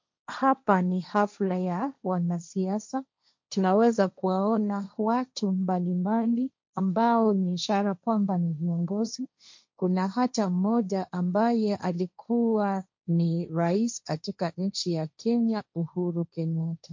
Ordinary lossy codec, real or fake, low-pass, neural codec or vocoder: MP3, 48 kbps; fake; 7.2 kHz; codec, 16 kHz, 1.1 kbps, Voila-Tokenizer